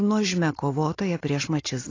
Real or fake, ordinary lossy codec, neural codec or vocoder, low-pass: real; AAC, 32 kbps; none; 7.2 kHz